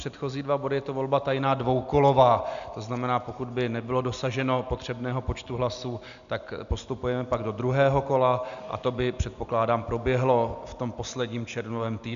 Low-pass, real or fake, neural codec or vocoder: 7.2 kHz; real; none